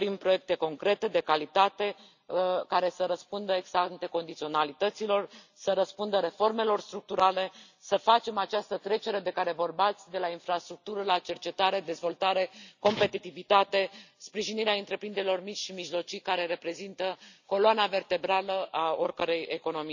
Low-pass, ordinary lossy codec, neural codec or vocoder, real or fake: 7.2 kHz; none; none; real